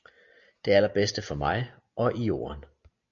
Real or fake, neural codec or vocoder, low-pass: real; none; 7.2 kHz